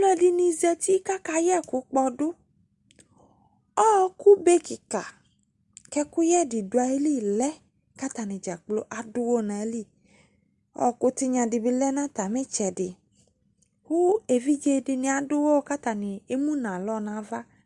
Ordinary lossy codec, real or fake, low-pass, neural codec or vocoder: Opus, 64 kbps; real; 10.8 kHz; none